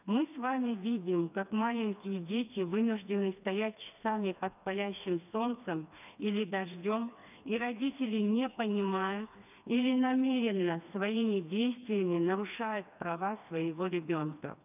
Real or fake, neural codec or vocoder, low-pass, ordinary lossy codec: fake; codec, 16 kHz, 2 kbps, FreqCodec, smaller model; 3.6 kHz; none